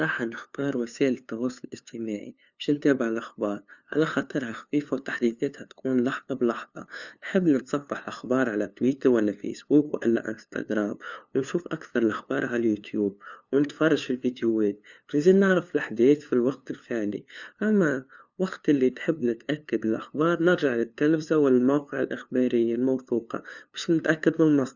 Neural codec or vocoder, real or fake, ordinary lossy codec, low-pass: codec, 16 kHz, 2 kbps, FunCodec, trained on LibriTTS, 25 frames a second; fake; none; 7.2 kHz